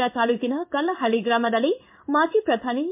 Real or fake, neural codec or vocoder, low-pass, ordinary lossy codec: fake; codec, 16 kHz in and 24 kHz out, 1 kbps, XY-Tokenizer; 3.6 kHz; MP3, 32 kbps